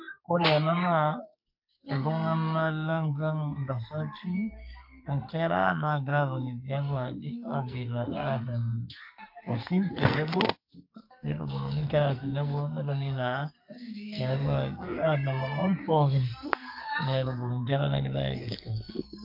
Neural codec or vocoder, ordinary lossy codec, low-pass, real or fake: codec, 44.1 kHz, 2.6 kbps, SNAC; MP3, 48 kbps; 5.4 kHz; fake